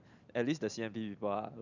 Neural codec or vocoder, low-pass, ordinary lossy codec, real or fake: none; 7.2 kHz; none; real